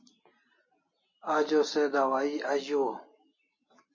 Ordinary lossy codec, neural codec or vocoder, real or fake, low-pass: MP3, 32 kbps; none; real; 7.2 kHz